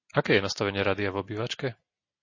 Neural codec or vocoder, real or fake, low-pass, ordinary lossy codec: none; real; 7.2 kHz; MP3, 32 kbps